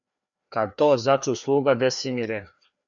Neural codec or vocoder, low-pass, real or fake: codec, 16 kHz, 2 kbps, FreqCodec, larger model; 7.2 kHz; fake